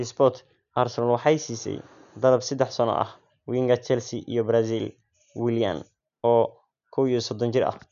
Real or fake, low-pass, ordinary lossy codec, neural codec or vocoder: real; 7.2 kHz; none; none